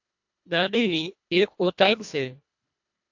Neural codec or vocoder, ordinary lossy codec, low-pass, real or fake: codec, 24 kHz, 1.5 kbps, HILCodec; AAC, 48 kbps; 7.2 kHz; fake